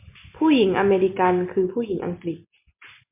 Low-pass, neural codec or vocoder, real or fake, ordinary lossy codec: 3.6 kHz; none; real; MP3, 32 kbps